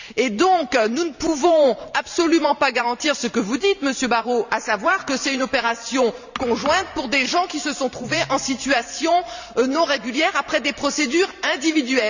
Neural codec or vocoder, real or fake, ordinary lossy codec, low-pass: vocoder, 44.1 kHz, 128 mel bands every 512 samples, BigVGAN v2; fake; none; 7.2 kHz